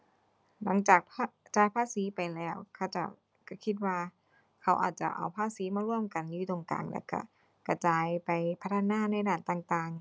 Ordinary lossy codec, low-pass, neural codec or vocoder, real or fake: none; none; none; real